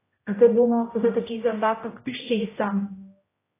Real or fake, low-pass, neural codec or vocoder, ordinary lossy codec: fake; 3.6 kHz; codec, 16 kHz, 0.5 kbps, X-Codec, HuBERT features, trained on general audio; AAC, 16 kbps